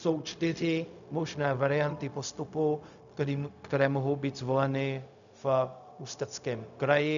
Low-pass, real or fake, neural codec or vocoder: 7.2 kHz; fake; codec, 16 kHz, 0.4 kbps, LongCat-Audio-Codec